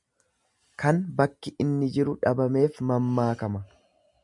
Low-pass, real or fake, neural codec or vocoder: 10.8 kHz; real; none